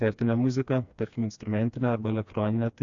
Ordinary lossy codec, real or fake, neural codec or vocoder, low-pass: AAC, 64 kbps; fake; codec, 16 kHz, 2 kbps, FreqCodec, smaller model; 7.2 kHz